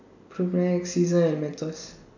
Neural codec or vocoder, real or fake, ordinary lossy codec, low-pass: none; real; none; 7.2 kHz